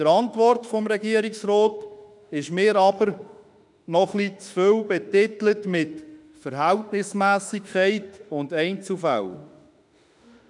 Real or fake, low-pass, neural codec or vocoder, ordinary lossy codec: fake; 10.8 kHz; autoencoder, 48 kHz, 32 numbers a frame, DAC-VAE, trained on Japanese speech; none